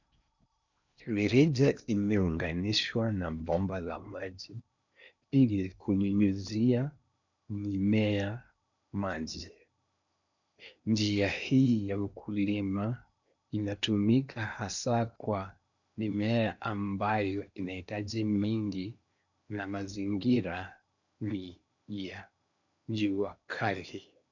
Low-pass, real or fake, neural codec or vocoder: 7.2 kHz; fake; codec, 16 kHz in and 24 kHz out, 0.8 kbps, FocalCodec, streaming, 65536 codes